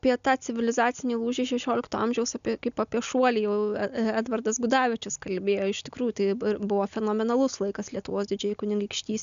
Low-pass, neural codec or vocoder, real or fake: 7.2 kHz; none; real